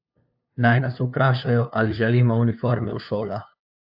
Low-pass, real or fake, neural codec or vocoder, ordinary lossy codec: 5.4 kHz; fake; codec, 16 kHz, 2 kbps, FunCodec, trained on LibriTTS, 25 frames a second; AAC, 48 kbps